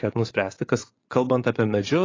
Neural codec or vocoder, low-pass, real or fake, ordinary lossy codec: vocoder, 24 kHz, 100 mel bands, Vocos; 7.2 kHz; fake; AAC, 32 kbps